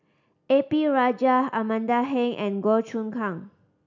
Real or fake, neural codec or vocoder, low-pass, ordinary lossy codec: real; none; 7.2 kHz; none